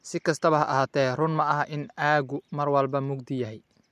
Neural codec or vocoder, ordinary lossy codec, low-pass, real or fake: none; MP3, 64 kbps; 14.4 kHz; real